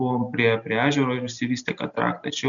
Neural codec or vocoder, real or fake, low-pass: none; real; 7.2 kHz